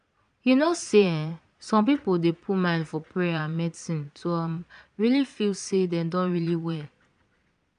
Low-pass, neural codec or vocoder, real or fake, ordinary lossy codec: 9.9 kHz; vocoder, 22.05 kHz, 80 mel bands, Vocos; fake; none